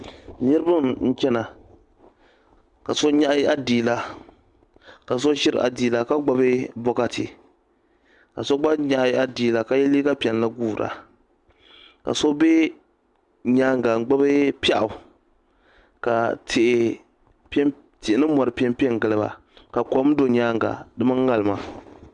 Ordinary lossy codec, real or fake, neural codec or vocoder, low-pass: Opus, 64 kbps; real; none; 10.8 kHz